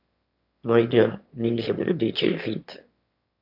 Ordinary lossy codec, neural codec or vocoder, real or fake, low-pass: AAC, 48 kbps; autoencoder, 22.05 kHz, a latent of 192 numbers a frame, VITS, trained on one speaker; fake; 5.4 kHz